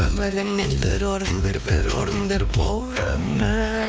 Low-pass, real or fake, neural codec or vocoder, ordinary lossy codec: none; fake; codec, 16 kHz, 2 kbps, X-Codec, WavLM features, trained on Multilingual LibriSpeech; none